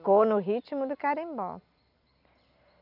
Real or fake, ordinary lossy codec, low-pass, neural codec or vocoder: real; none; 5.4 kHz; none